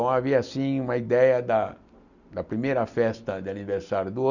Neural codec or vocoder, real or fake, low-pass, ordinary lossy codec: none; real; 7.2 kHz; none